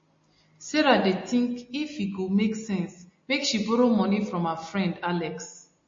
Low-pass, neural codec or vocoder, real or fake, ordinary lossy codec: 7.2 kHz; none; real; MP3, 32 kbps